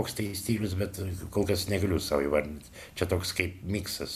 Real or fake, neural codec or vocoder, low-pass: real; none; 14.4 kHz